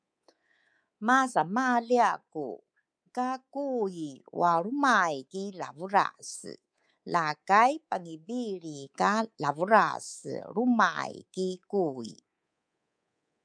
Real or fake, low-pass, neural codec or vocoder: fake; 9.9 kHz; codec, 24 kHz, 3.1 kbps, DualCodec